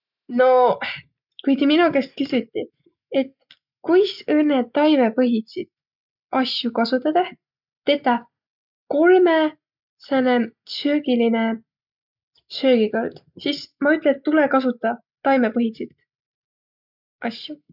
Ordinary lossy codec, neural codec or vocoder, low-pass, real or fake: none; none; 5.4 kHz; real